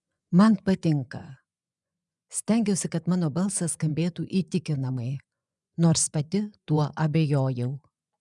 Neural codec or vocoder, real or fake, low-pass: vocoder, 24 kHz, 100 mel bands, Vocos; fake; 10.8 kHz